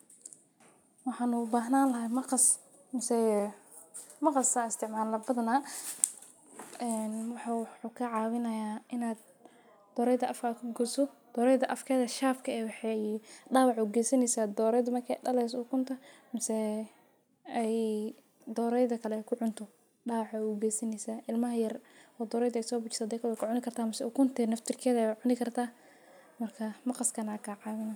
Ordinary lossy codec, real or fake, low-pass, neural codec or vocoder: none; real; none; none